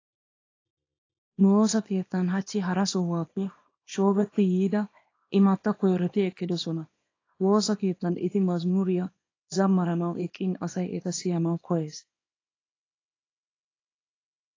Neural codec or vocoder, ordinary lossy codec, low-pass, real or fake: codec, 24 kHz, 0.9 kbps, WavTokenizer, small release; AAC, 32 kbps; 7.2 kHz; fake